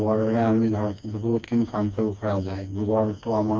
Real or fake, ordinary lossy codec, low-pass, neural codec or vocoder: fake; none; none; codec, 16 kHz, 2 kbps, FreqCodec, smaller model